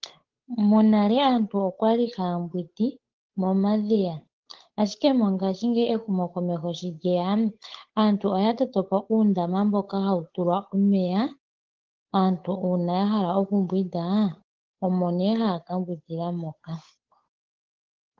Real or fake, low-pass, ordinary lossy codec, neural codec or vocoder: fake; 7.2 kHz; Opus, 16 kbps; codec, 16 kHz, 8 kbps, FunCodec, trained on Chinese and English, 25 frames a second